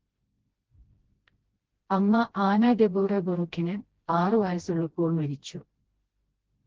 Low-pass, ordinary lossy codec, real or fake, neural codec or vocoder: 7.2 kHz; Opus, 16 kbps; fake; codec, 16 kHz, 1 kbps, FreqCodec, smaller model